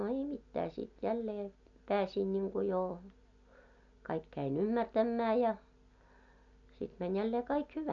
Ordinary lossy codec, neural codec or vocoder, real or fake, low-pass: none; none; real; 7.2 kHz